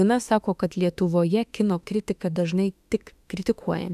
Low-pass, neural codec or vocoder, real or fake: 14.4 kHz; autoencoder, 48 kHz, 32 numbers a frame, DAC-VAE, trained on Japanese speech; fake